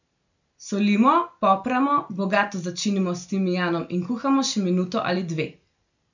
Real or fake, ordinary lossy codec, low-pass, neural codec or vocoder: real; none; 7.2 kHz; none